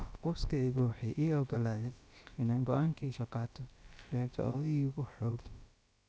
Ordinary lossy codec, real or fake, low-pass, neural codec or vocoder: none; fake; none; codec, 16 kHz, about 1 kbps, DyCAST, with the encoder's durations